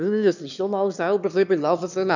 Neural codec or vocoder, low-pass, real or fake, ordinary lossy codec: autoencoder, 22.05 kHz, a latent of 192 numbers a frame, VITS, trained on one speaker; 7.2 kHz; fake; none